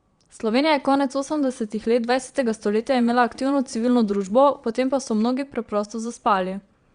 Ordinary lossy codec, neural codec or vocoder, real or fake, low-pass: Opus, 64 kbps; vocoder, 22.05 kHz, 80 mel bands, Vocos; fake; 9.9 kHz